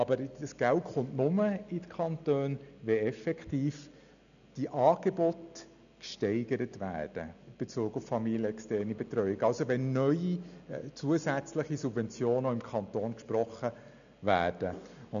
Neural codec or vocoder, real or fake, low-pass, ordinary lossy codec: none; real; 7.2 kHz; none